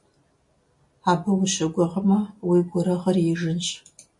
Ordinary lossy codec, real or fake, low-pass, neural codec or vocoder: MP3, 48 kbps; real; 10.8 kHz; none